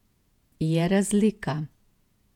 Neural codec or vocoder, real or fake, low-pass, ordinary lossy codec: vocoder, 48 kHz, 128 mel bands, Vocos; fake; 19.8 kHz; none